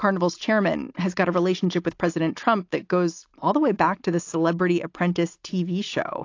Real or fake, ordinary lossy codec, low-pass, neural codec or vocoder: real; AAC, 48 kbps; 7.2 kHz; none